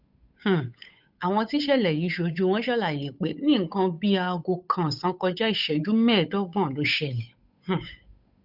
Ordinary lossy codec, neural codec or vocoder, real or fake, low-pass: none; codec, 16 kHz, 8 kbps, FunCodec, trained on Chinese and English, 25 frames a second; fake; 5.4 kHz